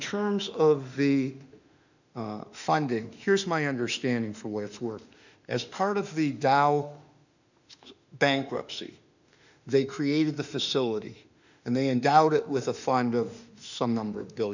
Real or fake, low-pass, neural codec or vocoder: fake; 7.2 kHz; autoencoder, 48 kHz, 32 numbers a frame, DAC-VAE, trained on Japanese speech